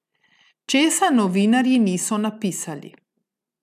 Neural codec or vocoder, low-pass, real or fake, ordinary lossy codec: none; 14.4 kHz; real; none